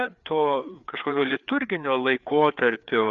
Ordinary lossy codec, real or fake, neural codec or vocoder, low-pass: Opus, 64 kbps; fake; codec, 16 kHz, 4 kbps, FreqCodec, larger model; 7.2 kHz